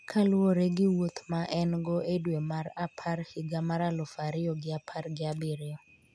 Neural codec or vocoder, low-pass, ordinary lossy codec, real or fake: none; none; none; real